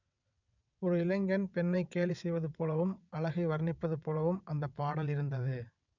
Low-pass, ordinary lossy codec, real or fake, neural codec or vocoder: 7.2 kHz; none; fake; vocoder, 22.05 kHz, 80 mel bands, WaveNeXt